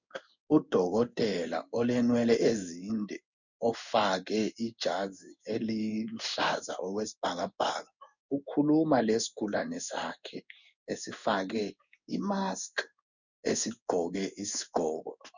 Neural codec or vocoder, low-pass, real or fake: codec, 16 kHz in and 24 kHz out, 1 kbps, XY-Tokenizer; 7.2 kHz; fake